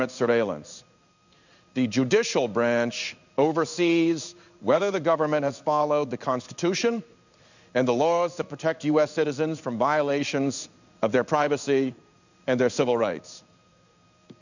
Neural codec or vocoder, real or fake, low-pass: codec, 16 kHz in and 24 kHz out, 1 kbps, XY-Tokenizer; fake; 7.2 kHz